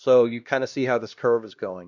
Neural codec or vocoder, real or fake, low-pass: codec, 16 kHz, 1 kbps, X-Codec, HuBERT features, trained on LibriSpeech; fake; 7.2 kHz